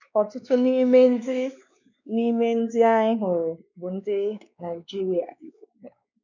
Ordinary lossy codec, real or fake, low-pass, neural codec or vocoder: none; fake; 7.2 kHz; codec, 16 kHz, 2 kbps, X-Codec, WavLM features, trained on Multilingual LibriSpeech